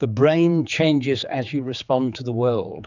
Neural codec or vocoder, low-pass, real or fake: codec, 16 kHz, 4 kbps, X-Codec, HuBERT features, trained on general audio; 7.2 kHz; fake